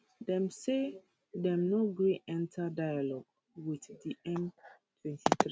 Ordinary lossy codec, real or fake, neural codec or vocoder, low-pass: none; real; none; none